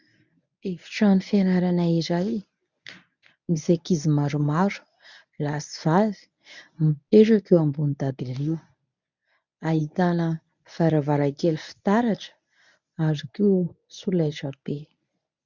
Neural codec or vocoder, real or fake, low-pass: codec, 24 kHz, 0.9 kbps, WavTokenizer, medium speech release version 1; fake; 7.2 kHz